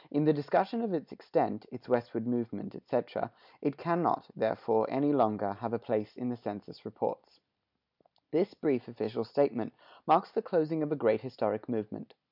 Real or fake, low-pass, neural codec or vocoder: real; 5.4 kHz; none